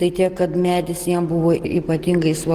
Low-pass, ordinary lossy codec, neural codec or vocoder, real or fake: 14.4 kHz; Opus, 16 kbps; none; real